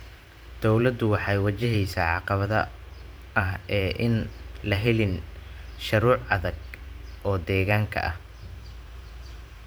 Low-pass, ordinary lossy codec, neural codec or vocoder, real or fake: none; none; vocoder, 44.1 kHz, 128 mel bands every 512 samples, BigVGAN v2; fake